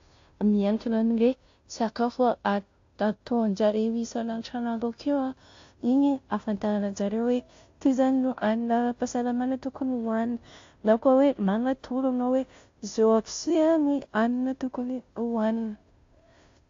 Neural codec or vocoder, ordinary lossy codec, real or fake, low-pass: codec, 16 kHz, 0.5 kbps, FunCodec, trained on Chinese and English, 25 frames a second; AAC, 48 kbps; fake; 7.2 kHz